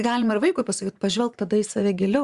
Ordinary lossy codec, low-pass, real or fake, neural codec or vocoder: Opus, 64 kbps; 10.8 kHz; fake; vocoder, 24 kHz, 100 mel bands, Vocos